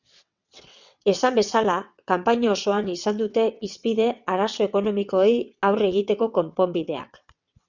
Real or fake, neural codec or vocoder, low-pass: fake; vocoder, 22.05 kHz, 80 mel bands, WaveNeXt; 7.2 kHz